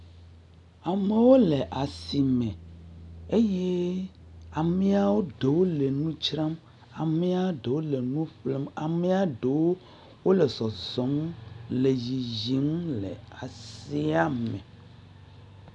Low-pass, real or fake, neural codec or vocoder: 10.8 kHz; real; none